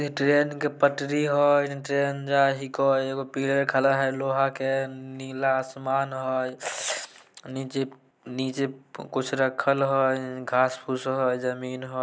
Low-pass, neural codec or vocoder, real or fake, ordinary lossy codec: none; none; real; none